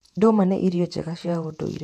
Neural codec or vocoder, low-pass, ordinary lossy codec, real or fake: vocoder, 48 kHz, 128 mel bands, Vocos; 14.4 kHz; none; fake